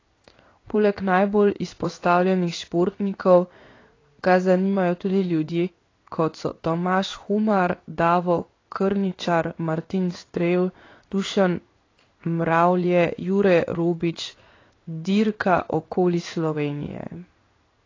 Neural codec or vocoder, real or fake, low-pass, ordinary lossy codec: codec, 16 kHz in and 24 kHz out, 1 kbps, XY-Tokenizer; fake; 7.2 kHz; AAC, 32 kbps